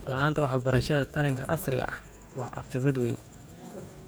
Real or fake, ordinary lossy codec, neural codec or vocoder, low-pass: fake; none; codec, 44.1 kHz, 2.6 kbps, DAC; none